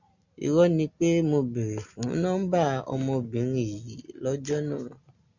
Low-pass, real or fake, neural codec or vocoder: 7.2 kHz; real; none